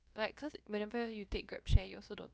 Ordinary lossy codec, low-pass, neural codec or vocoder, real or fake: none; none; codec, 16 kHz, about 1 kbps, DyCAST, with the encoder's durations; fake